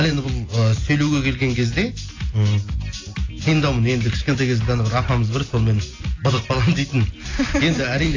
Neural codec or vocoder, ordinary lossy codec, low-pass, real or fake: none; AAC, 32 kbps; 7.2 kHz; real